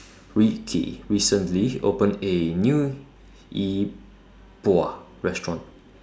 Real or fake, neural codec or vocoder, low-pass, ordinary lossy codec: real; none; none; none